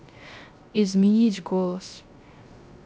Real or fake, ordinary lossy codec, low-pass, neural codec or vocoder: fake; none; none; codec, 16 kHz, 0.3 kbps, FocalCodec